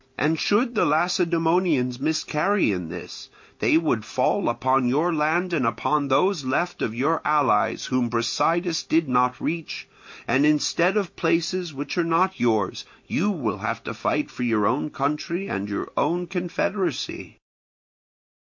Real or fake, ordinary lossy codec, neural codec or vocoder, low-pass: real; MP3, 48 kbps; none; 7.2 kHz